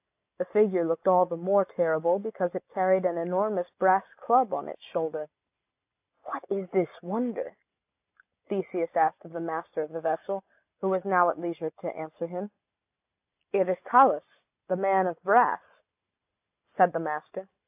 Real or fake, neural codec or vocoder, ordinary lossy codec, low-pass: real; none; AAC, 32 kbps; 3.6 kHz